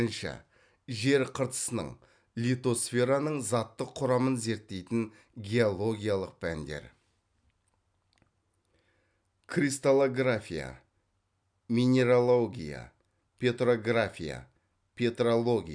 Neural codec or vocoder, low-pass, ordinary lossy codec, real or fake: none; 9.9 kHz; none; real